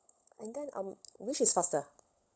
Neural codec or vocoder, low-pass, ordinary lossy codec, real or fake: codec, 16 kHz, 16 kbps, FunCodec, trained on LibriTTS, 50 frames a second; none; none; fake